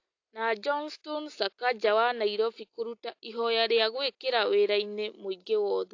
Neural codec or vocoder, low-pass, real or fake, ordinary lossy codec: none; 7.2 kHz; real; AAC, 48 kbps